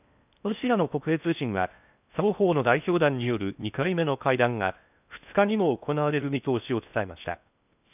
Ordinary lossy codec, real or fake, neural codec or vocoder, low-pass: none; fake; codec, 16 kHz in and 24 kHz out, 0.6 kbps, FocalCodec, streaming, 4096 codes; 3.6 kHz